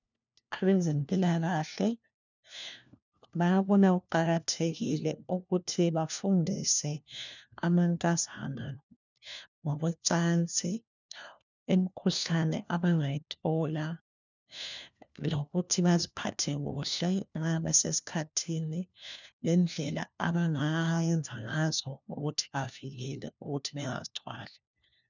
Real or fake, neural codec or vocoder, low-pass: fake; codec, 16 kHz, 1 kbps, FunCodec, trained on LibriTTS, 50 frames a second; 7.2 kHz